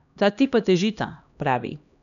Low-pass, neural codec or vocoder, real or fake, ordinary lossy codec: 7.2 kHz; codec, 16 kHz, 1 kbps, X-Codec, HuBERT features, trained on LibriSpeech; fake; none